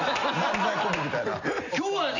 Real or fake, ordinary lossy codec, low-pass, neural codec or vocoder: real; none; 7.2 kHz; none